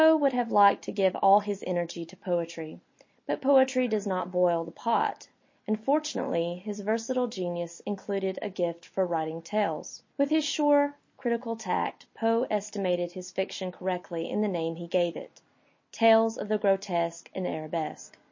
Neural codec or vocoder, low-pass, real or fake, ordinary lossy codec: none; 7.2 kHz; real; MP3, 32 kbps